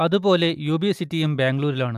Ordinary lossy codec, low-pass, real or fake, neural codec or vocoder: AAC, 96 kbps; 14.4 kHz; real; none